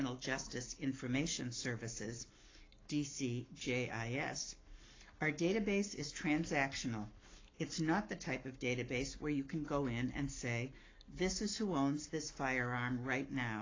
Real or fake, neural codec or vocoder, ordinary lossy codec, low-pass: fake; codec, 44.1 kHz, 7.8 kbps, Pupu-Codec; AAC, 32 kbps; 7.2 kHz